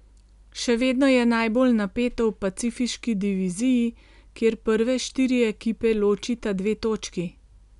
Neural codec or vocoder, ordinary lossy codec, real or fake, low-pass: none; MP3, 96 kbps; real; 10.8 kHz